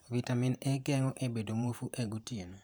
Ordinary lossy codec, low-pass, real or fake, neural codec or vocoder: none; none; fake; vocoder, 44.1 kHz, 128 mel bands every 512 samples, BigVGAN v2